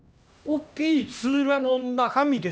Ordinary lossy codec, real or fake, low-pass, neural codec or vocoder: none; fake; none; codec, 16 kHz, 1 kbps, X-Codec, HuBERT features, trained on LibriSpeech